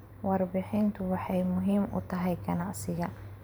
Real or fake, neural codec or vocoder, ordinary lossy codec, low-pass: fake; vocoder, 44.1 kHz, 128 mel bands every 512 samples, BigVGAN v2; none; none